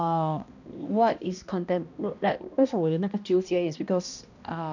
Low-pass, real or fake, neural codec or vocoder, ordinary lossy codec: 7.2 kHz; fake; codec, 16 kHz, 1 kbps, X-Codec, HuBERT features, trained on balanced general audio; none